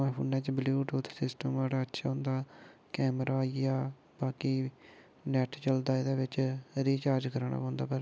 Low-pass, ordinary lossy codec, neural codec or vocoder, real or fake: none; none; none; real